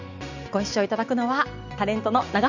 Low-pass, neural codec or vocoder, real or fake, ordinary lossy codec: 7.2 kHz; none; real; none